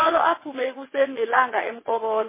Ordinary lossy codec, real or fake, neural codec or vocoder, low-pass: MP3, 16 kbps; fake; vocoder, 22.05 kHz, 80 mel bands, WaveNeXt; 3.6 kHz